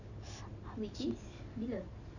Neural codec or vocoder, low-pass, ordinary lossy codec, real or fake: none; 7.2 kHz; none; real